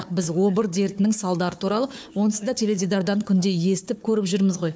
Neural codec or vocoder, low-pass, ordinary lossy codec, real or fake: codec, 16 kHz, 4 kbps, FunCodec, trained on Chinese and English, 50 frames a second; none; none; fake